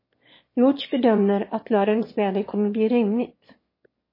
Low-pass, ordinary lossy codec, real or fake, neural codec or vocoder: 5.4 kHz; MP3, 24 kbps; fake; autoencoder, 22.05 kHz, a latent of 192 numbers a frame, VITS, trained on one speaker